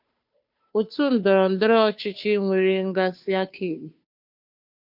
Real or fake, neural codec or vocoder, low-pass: fake; codec, 16 kHz, 2 kbps, FunCodec, trained on Chinese and English, 25 frames a second; 5.4 kHz